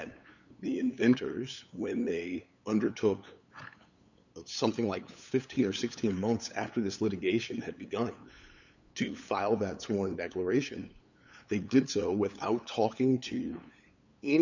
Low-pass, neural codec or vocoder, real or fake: 7.2 kHz; codec, 16 kHz, 8 kbps, FunCodec, trained on LibriTTS, 25 frames a second; fake